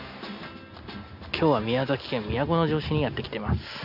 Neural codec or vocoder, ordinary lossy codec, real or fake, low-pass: none; none; real; 5.4 kHz